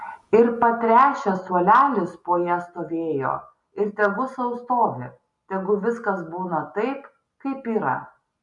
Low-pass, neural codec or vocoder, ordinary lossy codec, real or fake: 10.8 kHz; none; MP3, 96 kbps; real